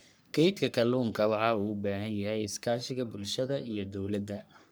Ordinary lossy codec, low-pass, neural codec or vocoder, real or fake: none; none; codec, 44.1 kHz, 3.4 kbps, Pupu-Codec; fake